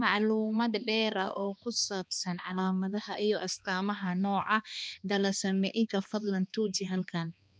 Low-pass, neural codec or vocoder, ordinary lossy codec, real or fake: none; codec, 16 kHz, 2 kbps, X-Codec, HuBERT features, trained on balanced general audio; none; fake